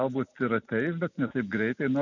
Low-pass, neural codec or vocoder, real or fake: 7.2 kHz; none; real